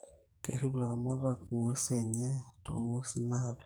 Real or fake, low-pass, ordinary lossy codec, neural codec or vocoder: fake; none; none; codec, 44.1 kHz, 2.6 kbps, SNAC